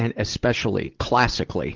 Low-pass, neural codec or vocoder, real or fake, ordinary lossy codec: 7.2 kHz; none; real; Opus, 16 kbps